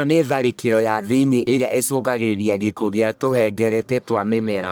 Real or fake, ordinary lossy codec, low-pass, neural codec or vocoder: fake; none; none; codec, 44.1 kHz, 1.7 kbps, Pupu-Codec